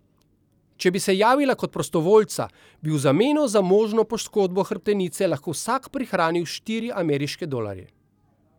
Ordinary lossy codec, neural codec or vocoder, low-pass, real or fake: none; none; 19.8 kHz; real